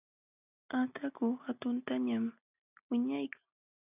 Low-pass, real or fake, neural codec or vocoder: 3.6 kHz; real; none